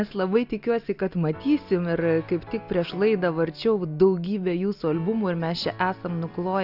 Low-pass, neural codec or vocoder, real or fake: 5.4 kHz; none; real